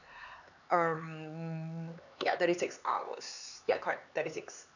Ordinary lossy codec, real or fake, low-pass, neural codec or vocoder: none; fake; 7.2 kHz; codec, 16 kHz, 4 kbps, X-Codec, HuBERT features, trained on LibriSpeech